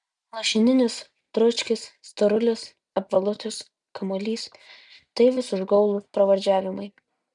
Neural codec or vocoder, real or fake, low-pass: none; real; 10.8 kHz